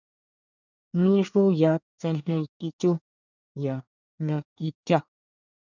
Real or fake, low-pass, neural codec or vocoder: fake; 7.2 kHz; codec, 24 kHz, 1 kbps, SNAC